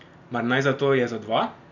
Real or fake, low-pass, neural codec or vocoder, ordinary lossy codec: real; 7.2 kHz; none; none